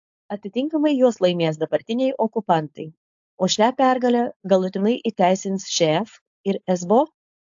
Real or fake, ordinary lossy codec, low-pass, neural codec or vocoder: fake; AAC, 64 kbps; 7.2 kHz; codec, 16 kHz, 4.8 kbps, FACodec